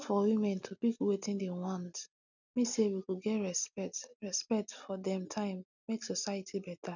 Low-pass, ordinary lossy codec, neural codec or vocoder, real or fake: 7.2 kHz; none; none; real